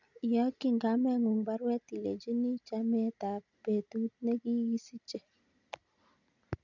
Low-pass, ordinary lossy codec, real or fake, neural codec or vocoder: 7.2 kHz; none; real; none